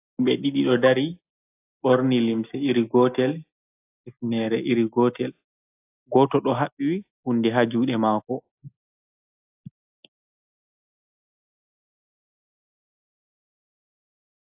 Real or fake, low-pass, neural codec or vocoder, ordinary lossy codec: real; 3.6 kHz; none; AAC, 32 kbps